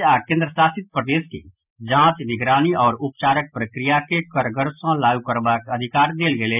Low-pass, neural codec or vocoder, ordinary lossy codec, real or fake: 3.6 kHz; none; none; real